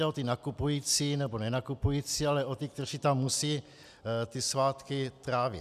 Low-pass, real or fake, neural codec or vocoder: 14.4 kHz; real; none